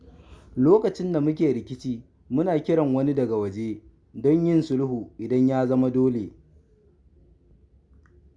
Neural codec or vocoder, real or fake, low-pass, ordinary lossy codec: none; real; 9.9 kHz; Opus, 64 kbps